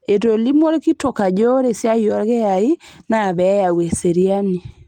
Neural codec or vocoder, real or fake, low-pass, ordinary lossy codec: none; real; 19.8 kHz; Opus, 24 kbps